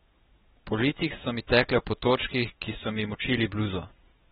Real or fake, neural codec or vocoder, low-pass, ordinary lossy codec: real; none; 19.8 kHz; AAC, 16 kbps